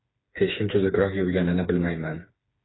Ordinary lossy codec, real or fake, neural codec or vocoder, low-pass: AAC, 16 kbps; fake; codec, 16 kHz, 4 kbps, FreqCodec, smaller model; 7.2 kHz